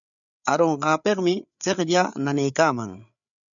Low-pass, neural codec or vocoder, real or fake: 7.2 kHz; codec, 16 kHz, 8 kbps, FreqCodec, larger model; fake